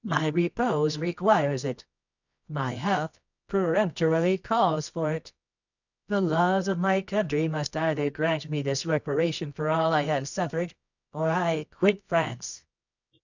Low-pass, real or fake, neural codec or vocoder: 7.2 kHz; fake; codec, 24 kHz, 0.9 kbps, WavTokenizer, medium music audio release